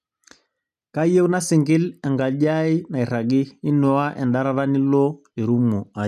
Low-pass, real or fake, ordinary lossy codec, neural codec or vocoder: 14.4 kHz; real; none; none